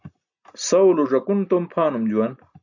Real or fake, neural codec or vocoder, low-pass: real; none; 7.2 kHz